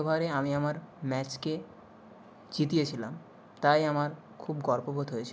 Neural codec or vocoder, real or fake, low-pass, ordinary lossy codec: none; real; none; none